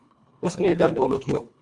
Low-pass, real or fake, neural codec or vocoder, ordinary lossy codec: 10.8 kHz; fake; codec, 24 kHz, 1.5 kbps, HILCodec; Opus, 64 kbps